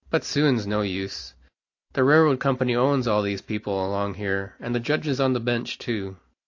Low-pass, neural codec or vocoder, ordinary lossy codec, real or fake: 7.2 kHz; none; AAC, 48 kbps; real